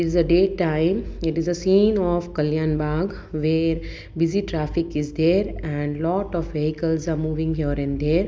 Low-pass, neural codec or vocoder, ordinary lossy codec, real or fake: none; none; none; real